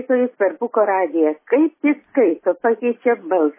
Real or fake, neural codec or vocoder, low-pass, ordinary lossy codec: real; none; 3.6 kHz; MP3, 16 kbps